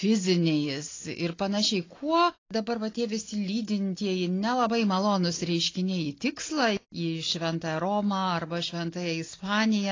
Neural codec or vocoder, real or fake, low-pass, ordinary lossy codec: none; real; 7.2 kHz; AAC, 32 kbps